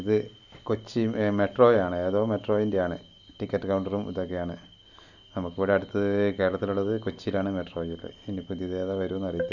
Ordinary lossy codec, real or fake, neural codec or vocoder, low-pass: none; real; none; 7.2 kHz